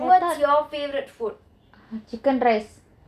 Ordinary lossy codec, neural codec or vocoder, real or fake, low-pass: none; none; real; 14.4 kHz